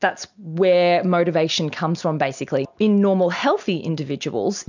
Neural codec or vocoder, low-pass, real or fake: none; 7.2 kHz; real